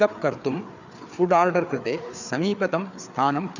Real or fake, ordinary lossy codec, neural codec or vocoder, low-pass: fake; none; codec, 16 kHz, 4 kbps, FreqCodec, larger model; 7.2 kHz